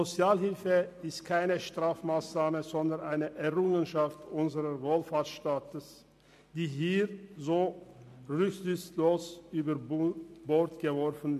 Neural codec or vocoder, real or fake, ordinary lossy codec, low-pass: none; real; MP3, 64 kbps; 14.4 kHz